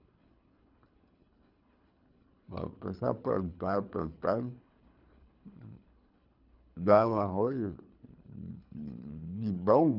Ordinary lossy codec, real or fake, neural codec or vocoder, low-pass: none; fake; codec, 24 kHz, 3 kbps, HILCodec; 5.4 kHz